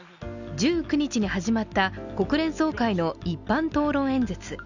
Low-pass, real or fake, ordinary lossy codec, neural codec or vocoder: 7.2 kHz; real; none; none